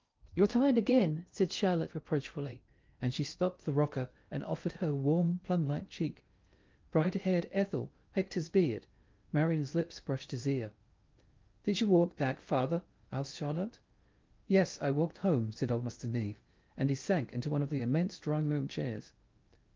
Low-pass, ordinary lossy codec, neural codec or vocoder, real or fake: 7.2 kHz; Opus, 24 kbps; codec, 16 kHz in and 24 kHz out, 0.6 kbps, FocalCodec, streaming, 2048 codes; fake